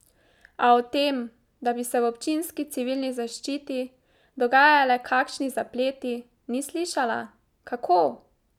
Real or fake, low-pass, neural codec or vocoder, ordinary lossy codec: real; 19.8 kHz; none; none